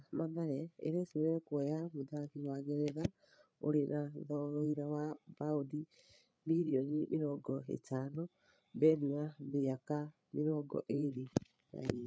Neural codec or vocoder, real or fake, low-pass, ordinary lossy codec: codec, 16 kHz, 8 kbps, FreqCodec, larger model; fake; none; none